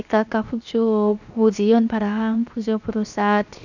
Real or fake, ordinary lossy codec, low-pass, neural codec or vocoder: fake; none; 7.2 kHz; codec, 16 kHz, 0.7 kbps, FocalCodec